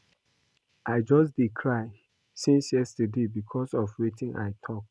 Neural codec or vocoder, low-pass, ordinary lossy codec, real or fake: none; none; none; real